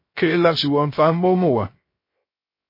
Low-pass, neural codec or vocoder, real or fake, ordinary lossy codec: 5.4 kHz; codec, 16 kHz, 0.3 kbps, FocalCodec; fake; MP3, 24 kbps